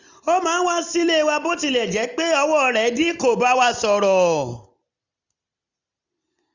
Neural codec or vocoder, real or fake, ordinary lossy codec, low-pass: none; real; none; 7.2 kHz